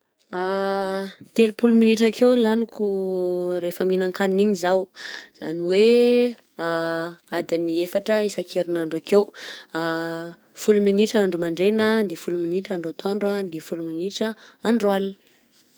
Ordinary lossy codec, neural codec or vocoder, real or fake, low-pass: none; codec, 44.1 kHz, 2.6 kbps, SNAC; fake; none